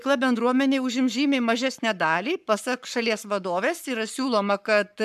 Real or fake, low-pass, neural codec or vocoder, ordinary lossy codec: fake; 14.4 kHz; codec, 44.1 kHz, 7.8 kbps, Pupu-Codec; MP3, 96 kbps